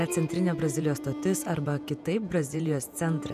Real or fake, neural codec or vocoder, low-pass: fake; vocoder, 48 kHz, 128 mel bands, Vocos; 14.4 kHz